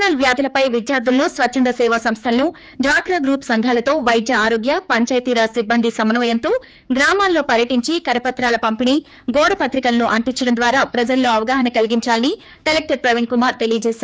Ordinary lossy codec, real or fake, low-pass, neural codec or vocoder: none; fake; none; codec, 16 kHz, 4 kbps, X-Codec, HuBERT features, trained on general audio